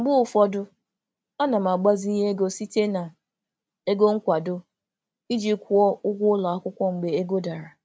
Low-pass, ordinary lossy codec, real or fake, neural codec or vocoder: none; none; real; none